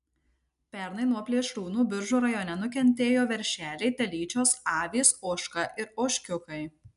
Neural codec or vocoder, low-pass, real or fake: none; 10.8 kHz; real